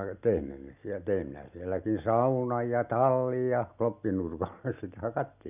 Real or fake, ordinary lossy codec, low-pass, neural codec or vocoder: real; none; 3.6 kHz; none